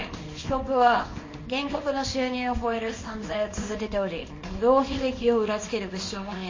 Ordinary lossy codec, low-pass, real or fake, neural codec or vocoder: MP3, 32 kbps; 7.2 kHz; fake; codec, 24 kHz, 0.9 kbps, WavTokenizer, small release